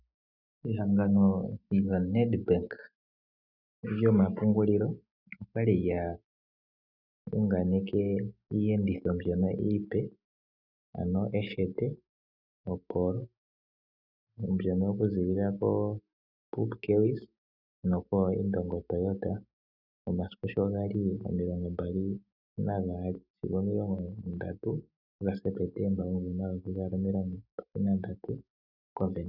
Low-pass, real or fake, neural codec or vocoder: 5.4 kHz; real; none